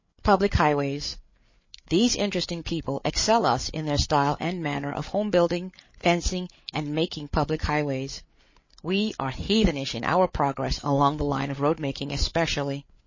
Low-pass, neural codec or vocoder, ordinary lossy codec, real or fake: 7.2 kHz; codec, 16 kHz, 8 kbps, FreqCodec, larger model; MP3, 32 kbps; fake